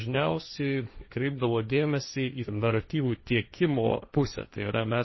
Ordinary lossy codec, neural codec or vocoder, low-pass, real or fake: MP3, 24 kbps; codec, 16 kHz, 1.1 kbps, Voila-Tokenizer; 7.2 kHz; fake